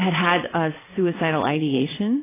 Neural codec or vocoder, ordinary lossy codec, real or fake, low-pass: vocoder, 22.05 kHz, 80 mel bands, Vocos; AAC, 16 kbps; fake; 3.6 kHz